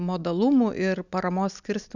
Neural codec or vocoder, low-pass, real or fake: none; 7.2 kHz; real